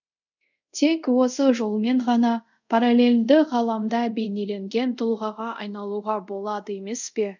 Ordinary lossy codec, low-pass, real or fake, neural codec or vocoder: none; 7.2 kHz; fake; codec, 24 kHz, 0.5 kbps, DualCodec